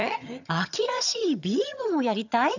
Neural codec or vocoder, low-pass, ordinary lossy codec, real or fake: vocoder, 22.05 kHz, 80 mel bands, HiFi-GAN; 7.2 kHz; none; fake